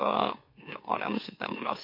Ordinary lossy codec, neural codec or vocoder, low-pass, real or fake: MP3, 32 kbps; autoencoder, 44.1 kHz, a latent of 192 numbers a frame, MeloTTS; 5.4 kHz; fake